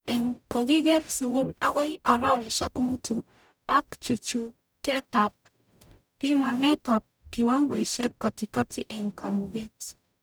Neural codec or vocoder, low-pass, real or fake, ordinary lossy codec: codec, 44.1 kHz, 0.9 kbps, DAC; none; fake; none